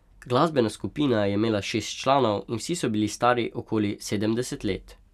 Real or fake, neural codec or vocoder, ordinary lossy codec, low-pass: real; none; none; 14.4 kHz